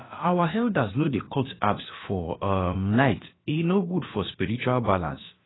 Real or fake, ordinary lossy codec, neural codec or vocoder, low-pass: fake; AAC, 16 kbps; codec, 16 kHz, about 1 kbps, DyCAST, with the encoder's durations; 7.2 kHz